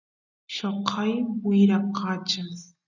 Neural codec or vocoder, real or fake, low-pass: none; real; 7.2 kHz